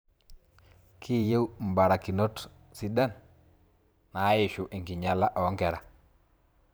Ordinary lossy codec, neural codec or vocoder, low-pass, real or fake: none; vocoder, 44.1 kHz, 128 mel bands every 512 samples, BigVGAN v2; none; fake